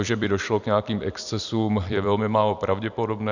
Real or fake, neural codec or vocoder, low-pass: fake; vocoder, 44.1 kHz, 128 mel bands every 256 samples, BigVGAN v2; 7.2 kHz